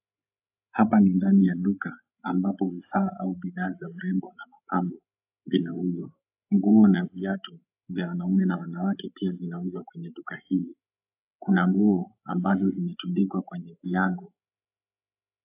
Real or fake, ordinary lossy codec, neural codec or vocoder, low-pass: fake; AAC, 32 kbps; codec, 16 kHz, 16 kbps, FreqCodec, larger model; 3.6 kHz